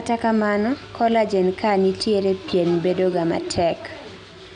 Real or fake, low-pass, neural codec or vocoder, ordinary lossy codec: real; 9.9 kHz; none; none